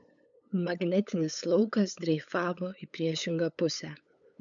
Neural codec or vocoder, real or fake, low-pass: codec, 16 kHz, 8 kbps, FunCodec, trained on LibriTTS, 25 frames a second; fake; 7.2 kHz